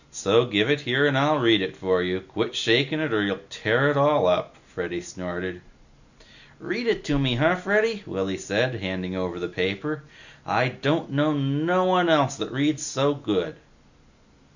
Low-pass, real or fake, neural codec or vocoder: 7.2 kHz; real; none